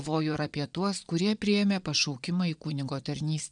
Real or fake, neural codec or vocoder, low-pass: fake; vocoder, 22.05 kHz, 80 mel bands, Vocos; 9.9 kHz